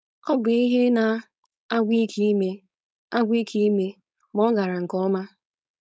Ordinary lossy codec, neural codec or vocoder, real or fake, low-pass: none; codec, 16 kHz, 4.8 kbps, FACodec; fake; none